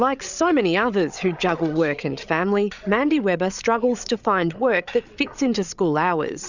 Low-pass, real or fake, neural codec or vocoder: 7.2 kHz; fake; codec, 16 kHz, 8 kbps, FreqCodec, larger model